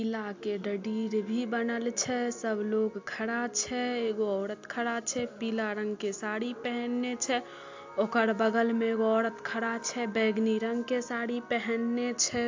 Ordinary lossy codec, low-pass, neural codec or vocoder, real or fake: AAC, 48 kbps; 7.2 kHz; none; real